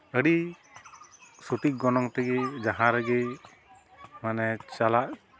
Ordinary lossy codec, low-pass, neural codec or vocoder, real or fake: none; none; none; real